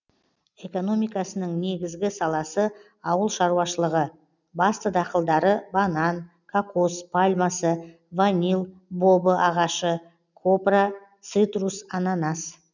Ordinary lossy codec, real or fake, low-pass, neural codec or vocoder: none; real; 7.2 kHz; none